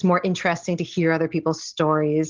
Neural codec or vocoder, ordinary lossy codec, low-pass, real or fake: none; Opus, 16 kbps; 7.2 kHz; real